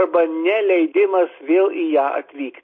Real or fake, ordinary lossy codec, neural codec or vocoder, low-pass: real; MP3, 32 kbps; none; 7.2 kHz